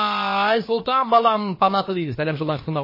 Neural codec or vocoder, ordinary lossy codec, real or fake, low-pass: codec, 16 kHz, about 1 kbps, DyCAST, with the encoder's durations; MP3, 24 kbps; fake; 5.4 kHz